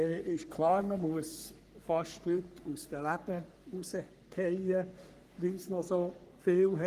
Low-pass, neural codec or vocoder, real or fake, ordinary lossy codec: 14.4 kHz; codec, 44.1 kHz, 3.4 kbps, Pupu-Codec; fake; Opus, 24 kbps